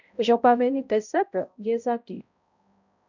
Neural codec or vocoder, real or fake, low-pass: codec, 16 kHz, 0.5 kbps, X-Codec, HuBERT features, trained on balanced general audio; fake; 7.2 kHz